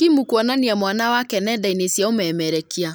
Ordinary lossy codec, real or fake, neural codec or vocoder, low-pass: none; real; none; none